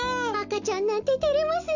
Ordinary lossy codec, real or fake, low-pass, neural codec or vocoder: none; real; 7.2 kHz; none